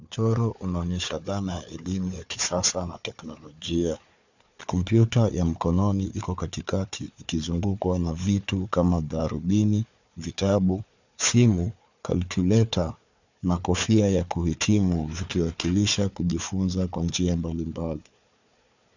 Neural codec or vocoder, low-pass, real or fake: codec, 16 kHz, 4 kbps, FunCodec, trained on Chinese and English, 50 frames a second; 7.2 kHz; fake